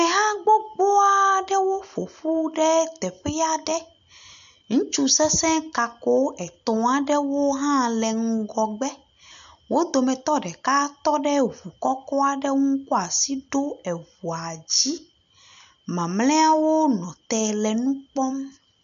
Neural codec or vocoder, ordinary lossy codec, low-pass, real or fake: none; AAC, 96 kbps; 7.2 kHz; real